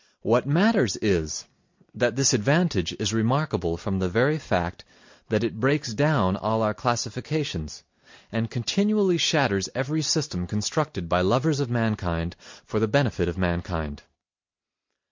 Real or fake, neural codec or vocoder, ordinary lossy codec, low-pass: real; none; MP3, 48 kbps; 7.2 kHz